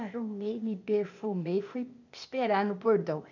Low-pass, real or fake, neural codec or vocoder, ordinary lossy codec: 7.2 kHz; fake; codec, 16 kHz, 0.8 kbps, ZipCodec; none